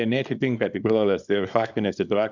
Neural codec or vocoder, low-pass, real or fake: codec, 24 kHz, 0.9 kbps, WavTokenizer, small release; 7.2 kHz; fake